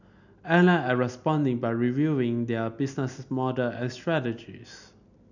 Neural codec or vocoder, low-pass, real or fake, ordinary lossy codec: none; 7.2 kHz; real; none